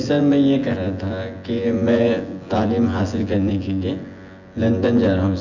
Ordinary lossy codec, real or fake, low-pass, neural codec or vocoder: none; fake; 7.2 kHz; vocoder, 24 kHz, 100 mel bands, Vocos